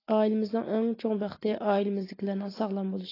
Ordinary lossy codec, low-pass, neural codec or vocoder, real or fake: AAC, 24 kbps; 5.4 kHz; none; real